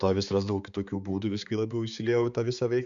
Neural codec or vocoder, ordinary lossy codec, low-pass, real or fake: codec, 16 kHz, 4 kbps, X-Codec, HuBERT features, trained on balanced general audio; Opus, 64 kbps; 7.2 kHz; fake